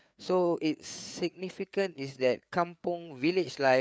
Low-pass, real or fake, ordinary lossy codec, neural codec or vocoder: none; fake; none; codec, 16 kHz, 4 kbps, FreqCodec, larger model